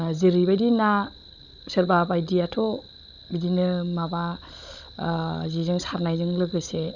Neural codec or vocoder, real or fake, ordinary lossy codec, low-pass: codec, 16 kHz, 16 kbps, FunCodec, trained on Chinese and English, 50 frames a second; fake; none; 7.2 kHz